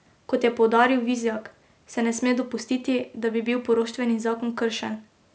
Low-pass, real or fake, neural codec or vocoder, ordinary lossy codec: none; real; none; none